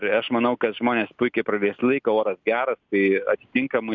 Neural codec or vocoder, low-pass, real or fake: none; 7.2 kHz; real